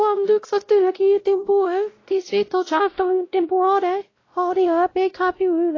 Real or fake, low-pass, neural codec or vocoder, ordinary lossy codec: fake; 7.2 kHz; codec, 16 kHz, 0.5 kbps, X-Codec, WavLM features, trained on Multilingual LibriSpeech; AAC, 32 kbps